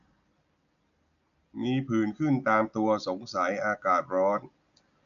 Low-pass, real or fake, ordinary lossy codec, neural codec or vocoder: 7.2 kHz; real; none; none